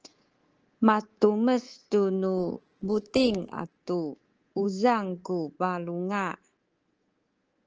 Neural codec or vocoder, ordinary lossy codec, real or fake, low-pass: codec, 24 kHz, 3.1 kbps, DualCodec; Opus, 16 kbps; fake; 7.2 kHz